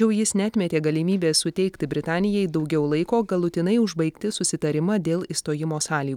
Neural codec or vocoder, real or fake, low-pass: none; real; 19.8 kHz